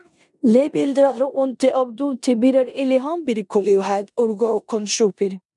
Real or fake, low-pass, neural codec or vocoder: fake; 10.8 kHz; codec, 16 kHz in and 24 kHz out, 0.9 kbps, LongCat-Audio-Codec, four codebook decoder